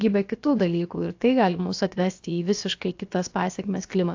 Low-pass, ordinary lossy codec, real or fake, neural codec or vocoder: 7.2 kHz; AAC, 48 kbps; fake; codec, 16 kHz, about 1 kbps, DyCAST, with the encoder's durations